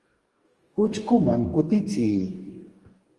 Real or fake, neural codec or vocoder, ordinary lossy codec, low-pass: fake; codec, 44.1 kHz, 2.6 kbps, DAC; Opus, 24 kbps; 10.8 kHz